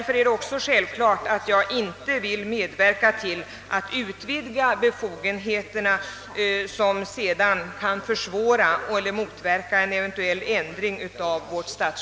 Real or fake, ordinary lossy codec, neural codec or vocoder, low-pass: real; none; none; none